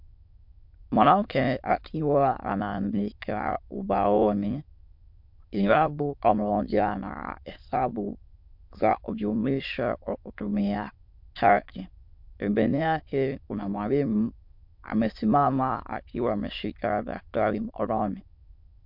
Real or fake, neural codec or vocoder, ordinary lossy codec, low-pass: fake; autoencoder, 22.05 kHz, a latent of 192 numbers a frame, VITS, trained on many speakers; MP3, 48 kbps; 5.4 kHz